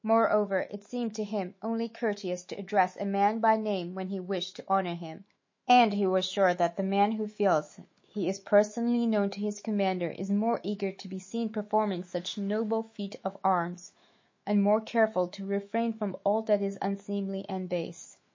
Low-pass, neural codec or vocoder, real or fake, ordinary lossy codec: 7.2 kHz; autoencoder, 48 kHz, 128 numbers a frame, DAC-VAE, trained on Japanese speech; fake; MP3, 32 kbps